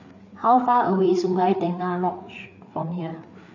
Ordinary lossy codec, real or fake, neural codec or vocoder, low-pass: none; fake; codec, 16 kHz, 4 kbps, FreqCodec, larger model; 7.2 kHz